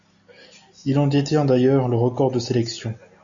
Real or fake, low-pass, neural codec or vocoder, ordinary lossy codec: real; 7.2 kHz; none; MP3, 64 kbps